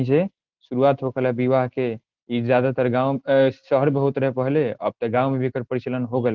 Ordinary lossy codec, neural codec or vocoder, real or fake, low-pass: Opus, 16 kbps; none; real; 7.2 kHz